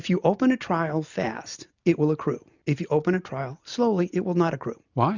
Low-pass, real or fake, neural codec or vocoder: 7.2 kHz; real; none